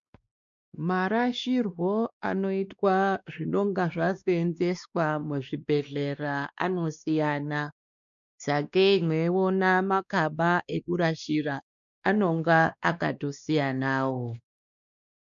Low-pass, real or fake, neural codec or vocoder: 7.2 kHz; fake; codec, 16 kHz, 2 kbps, X-Codec, WavLM features, trained on Multilingual LibriSpeech